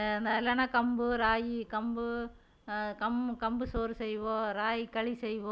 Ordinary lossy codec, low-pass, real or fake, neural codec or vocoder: none; none; real; none